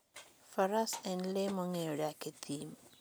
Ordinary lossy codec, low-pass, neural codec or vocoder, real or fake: none; none; none; real